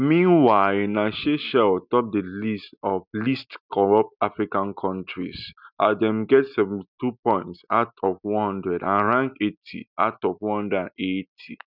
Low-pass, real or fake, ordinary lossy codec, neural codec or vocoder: 5.4 kHz; real; none; none